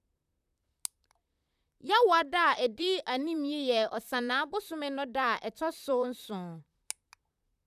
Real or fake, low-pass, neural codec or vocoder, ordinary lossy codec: fake; 14.4 kHz; vocoder, 44.1 kHz, 128 mel bands, Pupu-Vocoder; none